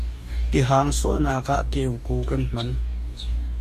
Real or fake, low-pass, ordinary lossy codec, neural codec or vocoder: fake; 14.4 kHz; MP3, 96 kbps; codec, 44.1 kHz, 2.6 kbps, DAC